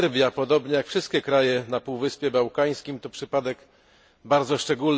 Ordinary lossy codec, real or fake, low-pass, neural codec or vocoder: none; real; none; none